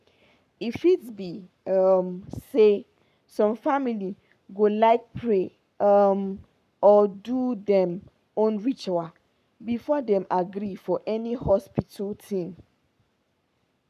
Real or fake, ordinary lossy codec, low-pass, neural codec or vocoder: fake; none; 14.4 kHz; codec, 44.1 kHz, 7.8 kbps, Pupu-Codec